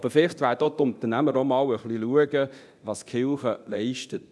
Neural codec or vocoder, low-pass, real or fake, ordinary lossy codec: codec, 24 kHz, 0.9 kbps, DualCodec; none; fake; none